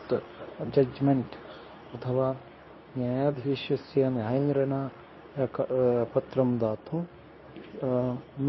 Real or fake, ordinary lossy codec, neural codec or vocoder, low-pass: fake; MP3, 24 kbps; codec, 24 kHz, 0.9 kbps, WavTokenizer, medium speech release version 2; 7.2 kHz